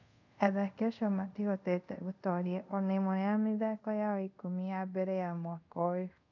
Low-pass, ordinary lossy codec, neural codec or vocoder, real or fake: 7.2 kHz; none; codec, 24 kHz, 0.5 kbps, DualCodec; fake